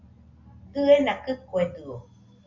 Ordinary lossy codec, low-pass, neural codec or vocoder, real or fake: MP3, 64 kbps; 7.2 kHz; none; real